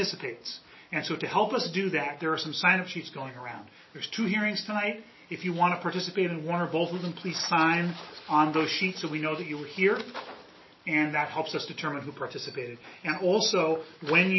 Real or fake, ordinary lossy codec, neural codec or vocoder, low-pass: real; MP3, 24 kbps; none; 7.2 kHz